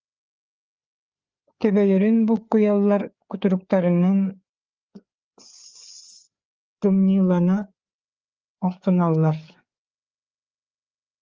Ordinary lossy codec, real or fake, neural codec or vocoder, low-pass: Opus, 32 kbps; fake; codec, 16 kHz, 4 kbps, FreqCodec, larger model; 7.2 kHz